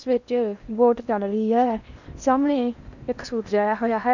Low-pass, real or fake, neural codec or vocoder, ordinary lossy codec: 7.2 kHz; fake; codec, 16 kHz in and 24 kHz out, 0.8 kbps, FocalCodec, streaming, 65536 codes; none